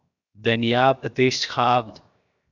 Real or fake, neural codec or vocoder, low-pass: fake; codec, 16 kHz, 0.7 kbps, FocalCodec; 7.2 kHz